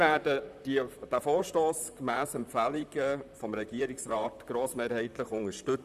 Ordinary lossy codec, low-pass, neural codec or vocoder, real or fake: none; 14.4 kHz; vocoder, 44.1 kHz, 128 mel bands, Pupu-Vocoder; fake